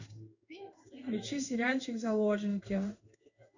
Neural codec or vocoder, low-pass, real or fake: codec, 16 kHz in and 24 kHz out, 1 kbps, XY-Tokenizer; 7.2 kHz; fake